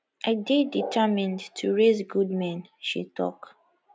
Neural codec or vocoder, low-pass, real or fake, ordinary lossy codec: none; none; real; none